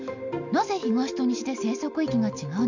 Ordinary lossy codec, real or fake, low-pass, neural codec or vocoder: none; real; 7.2 kHz; none